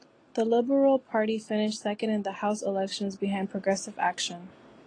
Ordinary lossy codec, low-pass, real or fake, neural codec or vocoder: AAC, 32 kbps; 9.9 kHz; real; none